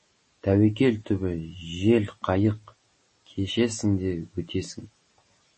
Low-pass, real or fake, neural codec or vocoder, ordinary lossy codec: 9.9 kHz; real; none; MP3, 32 kbps